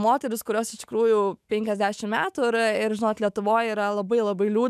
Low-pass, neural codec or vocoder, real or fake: 14.4 kHz; autoencoder, 48 kHz, 128 numbers a frame, DAC-VAE, trained on Japanese speech; fake